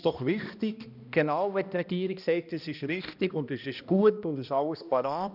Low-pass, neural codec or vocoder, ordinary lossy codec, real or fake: 5.4 kHz; codec, 16 kHz, 1 kbps, X-Codec, HuBERT features, trained on balanced general audio; none; fake